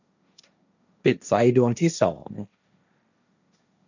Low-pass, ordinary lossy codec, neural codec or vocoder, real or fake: 7.2 kHz; none; codec, 16 kHz, 1.1 kbps, Voila-Tokenizer; fake